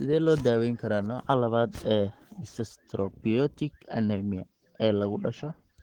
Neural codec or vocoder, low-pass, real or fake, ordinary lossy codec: codec, 44.1 kHz, 7.8 kbps, Pupu-Codec; 19.8 kHz; fake; Opus, 16 kbps